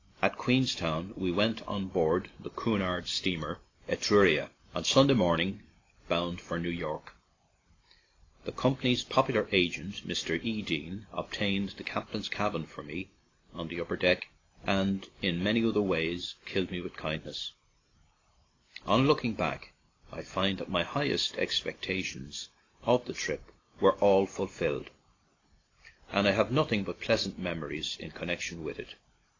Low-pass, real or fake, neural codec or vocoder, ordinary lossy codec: 7.2 kHz; real; none; AAC, 32 kbps